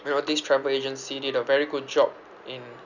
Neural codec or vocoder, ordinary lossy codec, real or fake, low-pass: none; none; real; 7.2 kHz